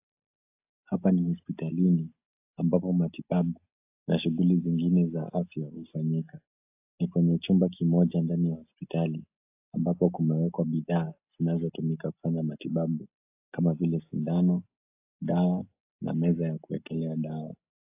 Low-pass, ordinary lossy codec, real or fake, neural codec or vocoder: 3.6 kHz; AAC, 32 kbps; real; none